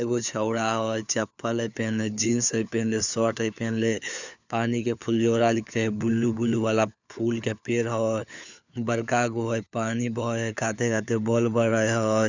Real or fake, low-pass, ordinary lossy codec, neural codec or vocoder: fake; 7.2 kHz; none; codec, 16 kHz, 4 kbps, FunCodec, trained on LibriTTS, 50 frames a second